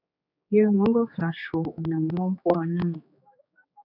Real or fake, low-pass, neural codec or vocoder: fake; 5.4 kHz; codec, 16 kHz, 4 kbps, X-Codec, HuBERT features, trained on general audio